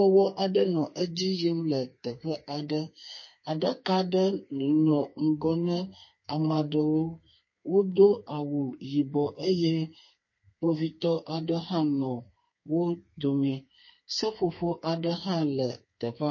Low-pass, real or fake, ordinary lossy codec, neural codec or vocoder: 7.2 kHz; fake; MP3, 32 kbps; codec, 32 kHz, 1.9 kbps, SNAC